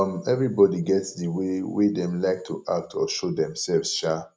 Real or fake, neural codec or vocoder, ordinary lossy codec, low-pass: real; none; none; none